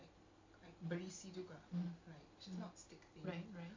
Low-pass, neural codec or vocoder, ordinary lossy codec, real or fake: 7.2 kHz; none; MP3, 48 kbps; real